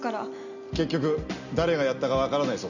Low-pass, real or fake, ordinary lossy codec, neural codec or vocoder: 7.2 kHz; real; none; none